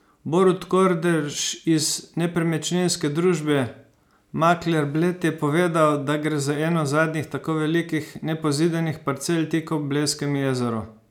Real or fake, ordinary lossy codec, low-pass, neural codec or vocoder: real; none; 19.8 kHz; none